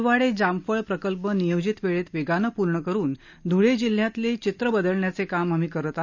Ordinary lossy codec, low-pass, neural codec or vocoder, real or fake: none; 7.2 kHz; none; real